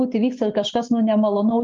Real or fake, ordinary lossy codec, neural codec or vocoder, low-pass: real; Opus, 24 kbps; none; 7.2 kHz